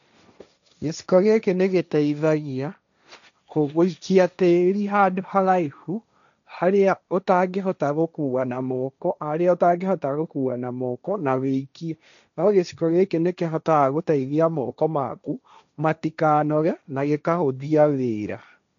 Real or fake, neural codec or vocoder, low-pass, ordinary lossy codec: fake; codec, 16 kHz, 1.1 kbps, Voila-Tokenizer; 7.2 kHz; none